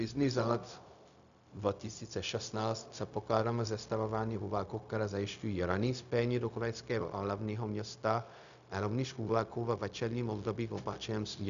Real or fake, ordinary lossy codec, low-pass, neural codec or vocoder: fake; MP3, 96 kbps; 7.2 kHz; codec, 16 kHz, 0.4 kbps, LongCat-Audio-Codec